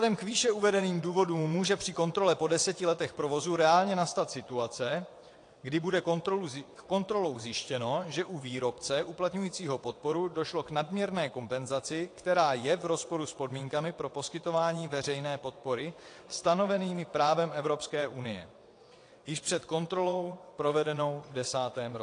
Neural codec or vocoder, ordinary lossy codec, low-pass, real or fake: vocoder, 22.05 kHz, 80 mel bands, WaveNeXt; AAC, 48 kbps; 9.9 kHz; fake